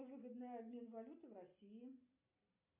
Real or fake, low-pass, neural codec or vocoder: real; 3.6 kHz; none